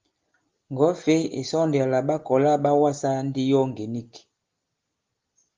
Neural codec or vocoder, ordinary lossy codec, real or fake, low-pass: none; Opus, 32 kbps; real; 7.2 kHz